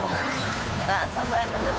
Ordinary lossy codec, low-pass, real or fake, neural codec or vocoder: none; none; fake; codec, 16 kHz, 2 kbps, FunCodec, trained on Chinese and English, 25 frames a second